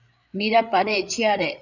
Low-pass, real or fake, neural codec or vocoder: 7.2 kHz; fake; codec, 16 kHz, 8 kbps, FreqCodec, larger model